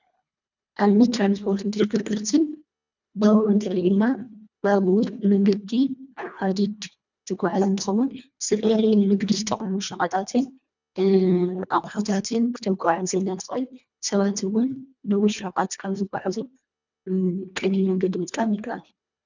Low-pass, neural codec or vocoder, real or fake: 7.2 kHz; codec, 24 kHz, 1.5 kbps, HILCodec; fake